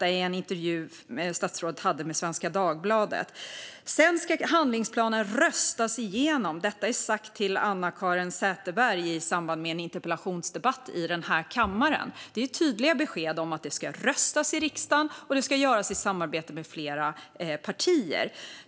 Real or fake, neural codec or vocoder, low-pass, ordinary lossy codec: real; none; none; none